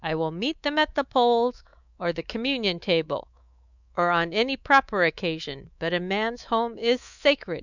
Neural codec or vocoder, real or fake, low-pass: codec, 24 kHz, 3.1 kbps, DualCodec; fake; 7.2 kHz